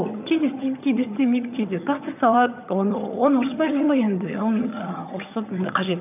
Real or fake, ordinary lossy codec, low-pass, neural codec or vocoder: fake; none; 3.6 kHz; vocoder, 22.05 kHz, 80 mel bands, HiFi-GAN